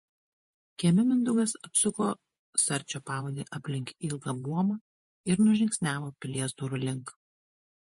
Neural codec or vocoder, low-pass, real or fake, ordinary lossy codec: vocoder, 48 kHz, 128 mel bands, Vocos; 14.4 kHz; fake; MP3, 48 kbps